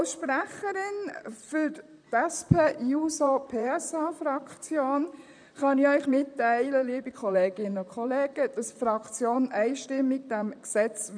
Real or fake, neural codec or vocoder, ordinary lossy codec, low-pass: fake; vocoder, 44.1 kHz, 128 mel bands, Pupu-Vocoder; none; 9.9 kHz